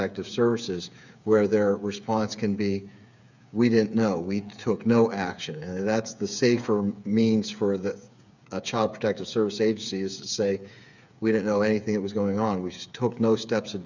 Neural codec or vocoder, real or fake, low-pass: codec, 16 kHz, 8 kbps, FreqCodec, smaller model; fake; 7.2 kHz